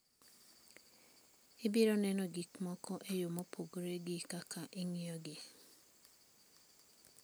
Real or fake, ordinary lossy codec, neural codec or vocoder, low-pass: real; none; none; none